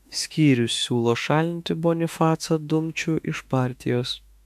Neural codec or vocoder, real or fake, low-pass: autoencoder, 48 kHz, 32 numbers a frame, DAC-VAE, trained on Japanese speech; fake; 14.4 kHz